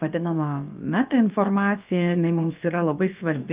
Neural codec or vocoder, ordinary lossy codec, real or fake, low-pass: codec, 16 kHz, about 1 kbps, DyCAST, with the encoder's durations; Opus, 24 kbps; fake; 3.6 kHz